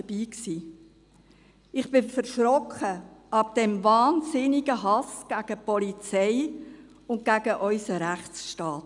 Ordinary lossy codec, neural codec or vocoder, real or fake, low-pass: none; none; real; 10.8 kHz